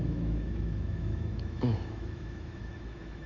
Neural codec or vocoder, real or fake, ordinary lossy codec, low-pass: autoencoder, 48 kHz, 128 numbers a frame, DAC-VAE, trained on Japanese speech; fake; Opus, 64 kbps; 7.2 kHz